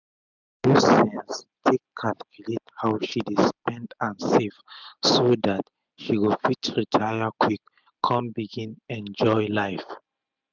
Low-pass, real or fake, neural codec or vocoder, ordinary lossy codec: 7.2 kHz; real; none; none